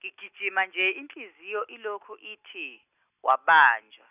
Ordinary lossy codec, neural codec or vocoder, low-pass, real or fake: none; none; 3.6 kHz; real